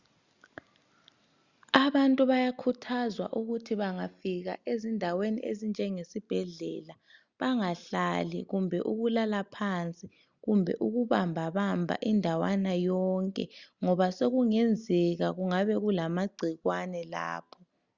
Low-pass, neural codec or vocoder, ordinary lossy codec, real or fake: 7.2 kHz; none; Opus, 64 kbps; real